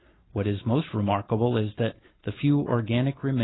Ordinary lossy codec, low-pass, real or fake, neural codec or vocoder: AAC, 16 kbps; 7.2 kHz; real; none